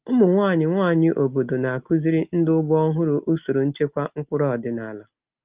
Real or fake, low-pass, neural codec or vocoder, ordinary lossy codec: real; 3.6 kHz; none; Opus, 24 kbps